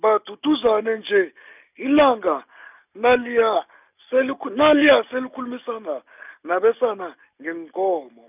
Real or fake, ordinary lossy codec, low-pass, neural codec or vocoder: real; none; 3.6 kHz; none